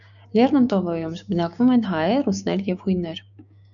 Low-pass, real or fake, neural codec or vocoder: 7.2 kHz; fake; codec, 16 kHz, 6 kbps, DAC